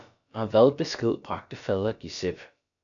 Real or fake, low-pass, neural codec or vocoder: fake; 7.2 kHz; codec, 16 kHz, about 1 kbps, DyCAST, with the encoder's durations